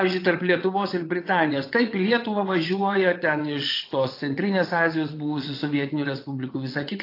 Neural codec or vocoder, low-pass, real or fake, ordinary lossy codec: vocoder, 22.05 kHz, 80 mel bands, WaveNeXt; 5.4 kHz; fake; AAC, 32 kbps